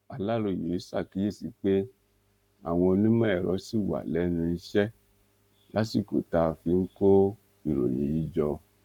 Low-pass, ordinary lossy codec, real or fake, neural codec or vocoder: 19.8 kHz; none; fake; codec, 44.1 kHz, 7.8 kbps, Pupu-Codec